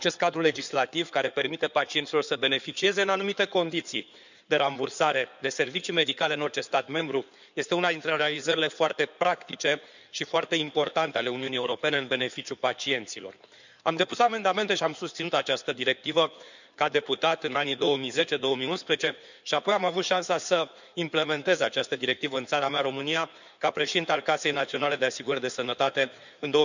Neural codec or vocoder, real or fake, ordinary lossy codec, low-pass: codec, 16 kHz in and 24 kHz out, 2.2 kbps, FireRedTTS-2 codec; fake; none; 7.2 kHz